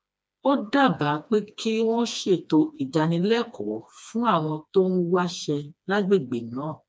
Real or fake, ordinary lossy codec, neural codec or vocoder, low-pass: fake; none; codec, 16 kHz, 2 kbps, FreqCodec, smaller model; none